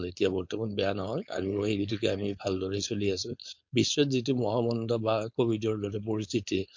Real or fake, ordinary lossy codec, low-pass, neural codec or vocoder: fake; MP3, 48 kbps; 7.2 kHz; codec, 16 kHz, 4.8 kbps, FACodec